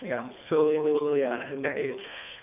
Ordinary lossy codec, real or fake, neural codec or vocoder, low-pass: none; fake; codec, 24 kHz, 1.5 kbps, HILCodec; 3.6 kHz